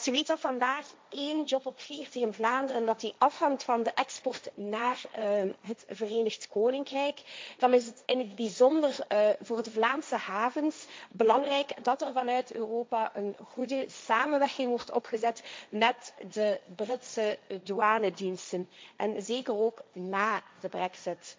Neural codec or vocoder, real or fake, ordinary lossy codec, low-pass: codec, 16 kHz, 1.1 kbps, Voila-Tokenizer; fake; none; none